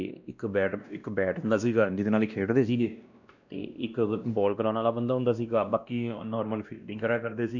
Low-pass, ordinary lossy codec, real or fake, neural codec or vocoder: 7.2 kHz; none; fake; codec, 16 kHz, 1 kbps, X-Codec, WavLM features, trained on Multilingual LibriSpeech